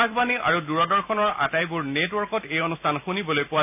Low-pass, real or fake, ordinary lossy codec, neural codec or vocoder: 3.6 kHz; real; none; none